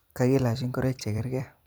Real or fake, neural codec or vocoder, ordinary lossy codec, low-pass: fake; vocoder, 44.1 kHz, 128 mel bands every 256 samples, BigVGAN v2; none; none